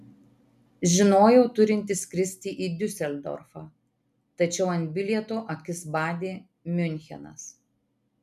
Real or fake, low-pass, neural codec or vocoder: real; 14.4 kHz; none